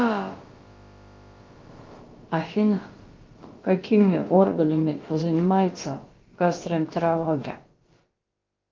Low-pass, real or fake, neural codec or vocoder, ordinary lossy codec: 7.2 kHz; fake; codec, 16 kHz, about 1 kbps, DyCAST, with the encoder's durations; Opus, 32 kbps